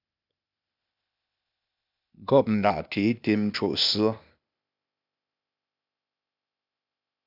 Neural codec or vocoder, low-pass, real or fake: codec, 16 kHz, 0.8 kbps, ZipCodec; 5.4 kHz; fake